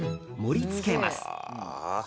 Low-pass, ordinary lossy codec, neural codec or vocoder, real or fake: none; none; none; real